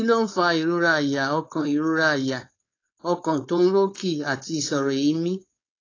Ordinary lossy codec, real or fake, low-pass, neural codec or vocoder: AAC, 32 kbps; fake; 7.2 kHz; codec, 16 kHz, 4.8 kbps, FACodec